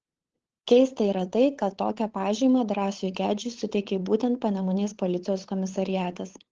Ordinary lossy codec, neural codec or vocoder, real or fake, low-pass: Opus, 16 kbps; codec, 16 kHz, 8 kbps, FunCodec, trained on LibriTTS, 25 frames a second; fake; 7.2 kHz